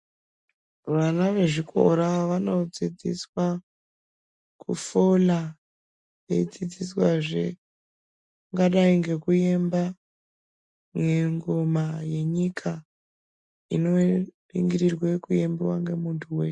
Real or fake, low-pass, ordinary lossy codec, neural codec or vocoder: real; 10.8 kHz; MP3, 64 kbps; none